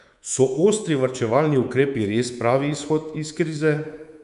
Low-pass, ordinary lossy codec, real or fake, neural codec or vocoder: 10.8 kHz; none; fake; codec, 24 kHz, 3.1 kbps, DualCodec